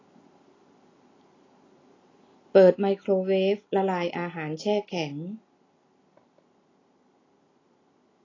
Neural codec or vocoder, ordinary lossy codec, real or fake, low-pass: none; AAC, 32 kbps; real; 7.2 kHz